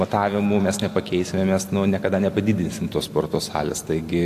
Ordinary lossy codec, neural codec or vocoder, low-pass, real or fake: AAC, 64 kbps; none; 14.4 kHz; real